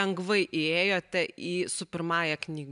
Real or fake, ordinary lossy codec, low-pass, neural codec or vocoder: real; MP3, 96 kbps; 10.8 kHz; none